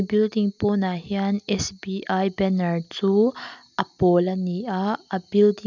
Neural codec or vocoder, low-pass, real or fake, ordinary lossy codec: none; 7.2 kHz; real; none